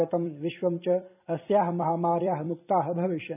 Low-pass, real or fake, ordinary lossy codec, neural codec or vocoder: 3.6 kHz; fake; none; vocoder, 44.1 kHz, 128 mel bands every 256 samples, BigVGAN v2